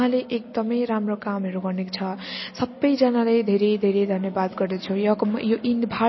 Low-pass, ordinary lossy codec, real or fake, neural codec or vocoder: 7.2 kHz; MP3, 24 kbps; real; none